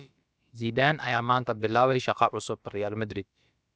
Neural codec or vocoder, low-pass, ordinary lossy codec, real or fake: codec, 16 kHz, about 1 kbps, DyCAST, with the encoder's durations; none; none; fake